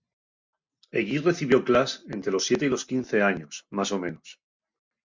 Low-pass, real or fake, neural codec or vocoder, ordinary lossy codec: 7.2 kHz; real; none; MP3, 64 kbps